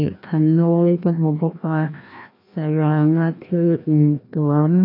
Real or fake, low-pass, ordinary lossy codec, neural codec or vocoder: fake; 5.4 kHz; AAC, 32 kbps; codec, 16 kHz, 1 kbps, FreqCodec, larger model